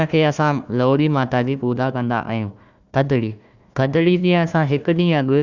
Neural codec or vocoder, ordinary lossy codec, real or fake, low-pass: codec, 16 kHz, 1 kbps, FunCodec, trained on Chinese and English, 50 frames a second; Opus, 64 kbps; fake; 7.2 kHz